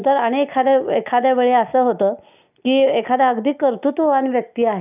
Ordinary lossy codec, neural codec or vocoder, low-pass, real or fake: none; none; 3.6 kHz; real